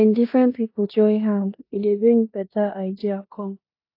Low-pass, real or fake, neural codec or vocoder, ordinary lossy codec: 5.4 kHz; fake; codec, 16 kHz in and 24 kHz out, 0.9 kbps, LongCat-Audio-Codec, four codebook decoder; AAC, 32 kbps